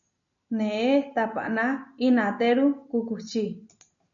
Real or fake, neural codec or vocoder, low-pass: real; none; 7.2 kHz